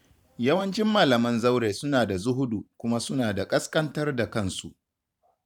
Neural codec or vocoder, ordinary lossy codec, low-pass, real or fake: vocoder, 44.1 kHz, 128 mel bands every 512 samples, BigVGAN v2; none; 19.8 kHz; fake